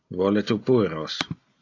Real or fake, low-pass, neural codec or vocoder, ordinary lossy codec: real; 7.2 kHz; none; AAC, 48 kbps